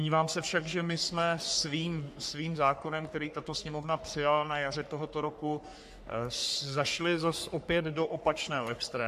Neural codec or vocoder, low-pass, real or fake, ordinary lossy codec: codec, 44.1 kHz, 3.4 kbps, Pupu-Codec; 14.4 kHz; fake; AAC, 96 kbps